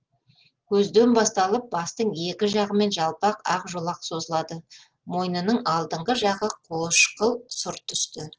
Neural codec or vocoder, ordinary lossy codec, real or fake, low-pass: none; Opus, 16 kbps; real; 7.2 kHz